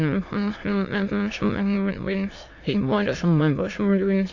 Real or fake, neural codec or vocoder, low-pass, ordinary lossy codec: fake; autoencoder, 22.05 kHz, a latent of 192 numbers a frame, VITS, trained on many speakers; 7.2 kHz; AAC, 48 kbps